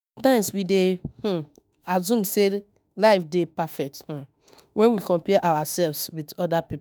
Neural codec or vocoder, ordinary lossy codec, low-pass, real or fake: autoencoder, 48 kHz, 32 numbers a frame, DAC-VAE, trained on Japanese speech; none; none; fake